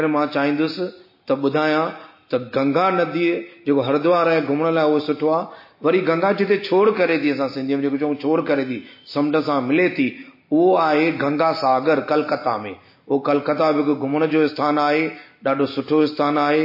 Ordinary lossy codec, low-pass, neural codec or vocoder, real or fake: MP3, 24 kbps; 5.4 kHz; none; real